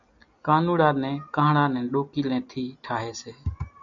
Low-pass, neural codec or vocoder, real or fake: 7.2 kHz; none; real